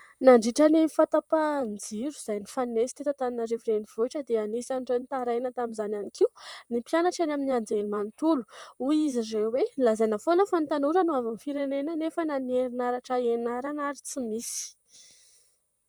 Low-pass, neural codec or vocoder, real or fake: 19.8 kHz; vocoder, 44.1 kHz, 128 mel bands, Pupu-Vocoder; fake